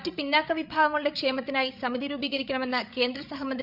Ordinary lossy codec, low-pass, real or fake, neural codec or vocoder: none; 5.4 kHz; fake; codec, 16 kHz, 8 kbps, FreqCodec, larger model